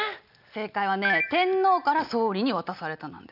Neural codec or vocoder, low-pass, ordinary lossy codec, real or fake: none; 5.4 kHz; none; real